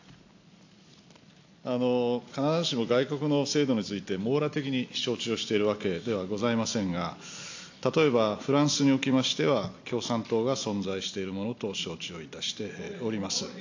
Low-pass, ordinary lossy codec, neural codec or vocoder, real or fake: 7.2 kHz; AAC, 48 kbps; none; real